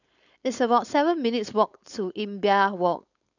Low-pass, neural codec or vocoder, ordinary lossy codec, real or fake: 7.2 kHz; codec, 16 kHz, 4.8 kbps, FACodec; none; fake